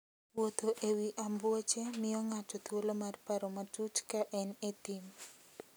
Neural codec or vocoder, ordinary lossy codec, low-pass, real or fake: none; none; none; real